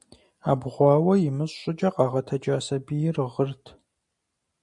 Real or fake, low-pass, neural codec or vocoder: real; 10.8 kHz; none